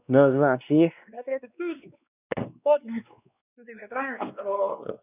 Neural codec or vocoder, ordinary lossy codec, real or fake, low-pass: codec, 16 kHz, 2 kbps, X-Codec, WavLM features, trained on Multilingual LibriSpeech; none; fake; 3.6 kHz